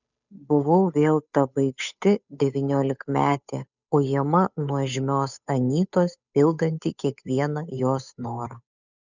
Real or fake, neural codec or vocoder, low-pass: fake; codec, 16 kHz, 8 kbps, FunCodec, trained on Chinese and English, 25 frames a second; 7.2 kHz